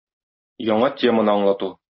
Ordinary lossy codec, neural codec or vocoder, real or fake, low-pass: MP3, 24 kbps; none; real; 7.2 kHz